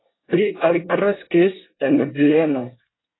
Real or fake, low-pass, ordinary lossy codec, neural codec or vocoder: fake; 7.2 kHz; AAC, 16 kbps; codec, 24 kHz, 1 kbps, SNAC